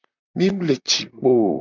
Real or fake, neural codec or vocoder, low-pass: fake; vocoder, 44.1 kHz, 128 mel bands, Pupu-Vocoder; 7.2 kHz